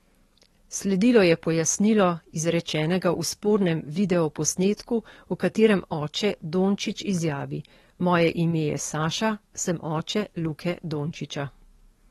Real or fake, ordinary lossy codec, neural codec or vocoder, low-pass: fake; AAC, 32 kbps; codec, 44.1 kHz, 7.8 kbps, DAC; 19.8 kHz